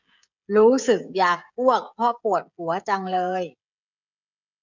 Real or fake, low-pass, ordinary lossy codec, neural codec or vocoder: fake; 7.2 kHz; none; codec, 16 kHz, 16 kbps, FreqCodec, smaller model